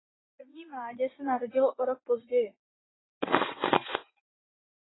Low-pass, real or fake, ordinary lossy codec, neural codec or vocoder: 7.2 kHz; fake; AAC, 16 kbps; vocoder, 44.1 kHz, 80 mel bands, Vocos